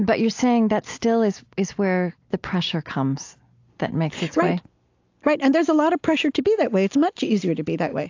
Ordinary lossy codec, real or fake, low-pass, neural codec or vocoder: AAC, 48 kbps; real; 7.2 kHz; none